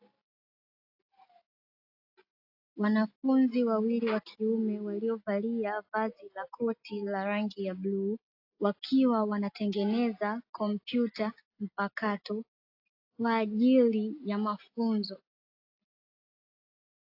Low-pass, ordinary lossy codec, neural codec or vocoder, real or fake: 5.4 kHz; AAC, 32 kbps; none; real